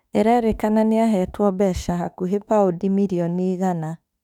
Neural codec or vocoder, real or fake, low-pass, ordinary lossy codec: autoencoder, 48 kHz, 32 numbers a frame, DAC-VAE, trained on Japanese speech; fake; 19.8 kHz; none